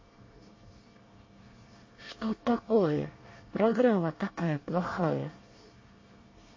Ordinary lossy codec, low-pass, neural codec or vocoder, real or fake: MP3, 32 kbps; 7.2 kHz; codec, 24 kHz, 1 kbps, SNAC; fake